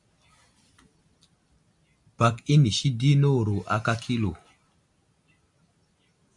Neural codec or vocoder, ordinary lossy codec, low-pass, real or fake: none; MP3, 64 kbps; 10.8 kHz; real